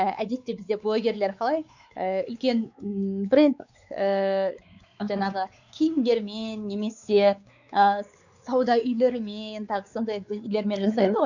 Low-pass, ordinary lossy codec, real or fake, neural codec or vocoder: 7.2 kHz; none; fake; codec, 16 kHz, 4 kbps, X-Codec, WavLM features, trained on Multilingual LibriSpeech